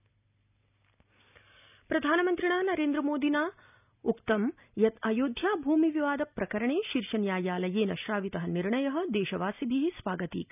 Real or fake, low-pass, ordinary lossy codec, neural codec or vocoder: real; 3.6 kHz; none; none